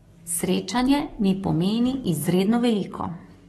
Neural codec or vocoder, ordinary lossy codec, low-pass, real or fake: codec, 44.1 kHz, 7.8 kbps, DAC; AAC, 32 kbps; 19.8 kHz; fake